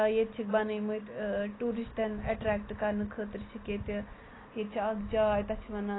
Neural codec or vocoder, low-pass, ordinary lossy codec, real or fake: none; 7.2 kHz; AAC, 16 kbps; real